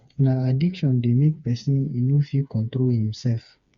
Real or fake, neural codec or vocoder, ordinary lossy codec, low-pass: fake; codec, 16 kHz, 4 kbps, FreqCodec, smaller model; Opus, 64 kbps; 7.2 kHz